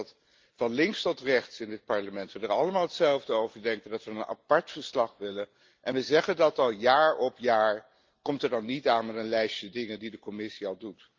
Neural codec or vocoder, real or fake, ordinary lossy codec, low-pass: none; real; Opus, 32 kbps; 7.2 kHz